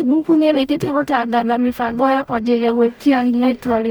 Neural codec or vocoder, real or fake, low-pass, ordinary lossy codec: codec, 44.1 kHz, 0.9 kbps, DAC; fake; none; none